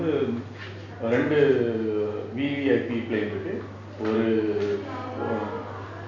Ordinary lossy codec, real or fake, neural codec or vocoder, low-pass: none; real; none; 7.2 kHz